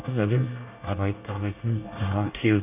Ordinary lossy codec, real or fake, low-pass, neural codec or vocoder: none; fake; 3.6 kHz; codec, 24 kHz, 1 kbps, SNAC